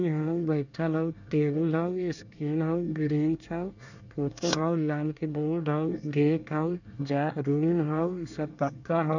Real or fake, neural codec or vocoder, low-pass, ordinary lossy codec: fake; codec, 24 kHz, 1 kbps, SNAC; 7.2 kHz; none